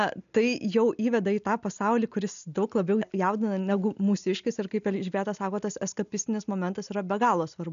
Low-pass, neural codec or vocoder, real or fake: 7.2 kHz; none; real